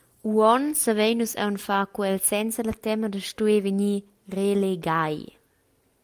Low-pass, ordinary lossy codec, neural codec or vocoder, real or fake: 14.4 kHz; Opus, 32 kbps; none; real